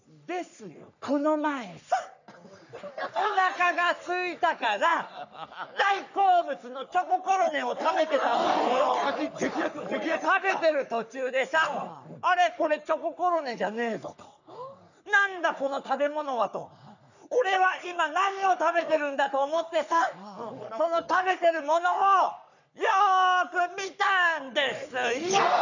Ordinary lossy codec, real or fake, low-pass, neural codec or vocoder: none; fake; 7.2 kHz; codec, 44.1 kHz, 3.4 kbps, Pupu-Codec